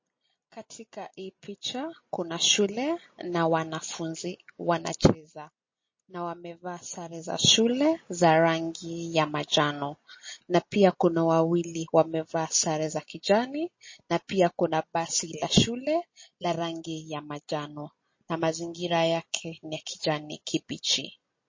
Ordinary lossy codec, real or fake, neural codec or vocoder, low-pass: MP3, 32 kbps; real; none; 7.2 kHz